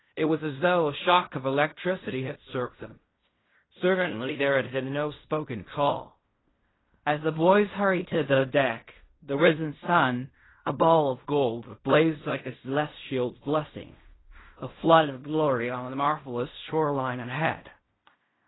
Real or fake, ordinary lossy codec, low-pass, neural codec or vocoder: fake; AAC, 16 kbps; 7.2 kHz; codec, 16 kHz in and 24 kHz out, 0.4 kbps, LongCat-Audio-Codec, fine tuned four codebook decoder